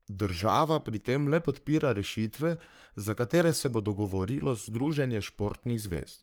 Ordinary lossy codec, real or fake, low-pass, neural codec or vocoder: none; fake; none; codec, 44.1 kHz, 3.4 kbps, Pupu-Codec